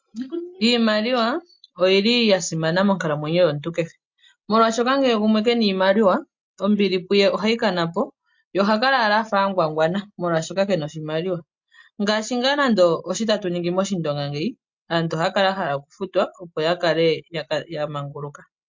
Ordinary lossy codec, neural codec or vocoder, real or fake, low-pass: MP3, 48 kbps; none; real; 7.2 kHz